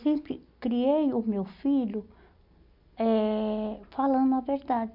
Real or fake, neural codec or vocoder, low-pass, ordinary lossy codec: real; none; 5.4 kHz; MP3, 48 kbps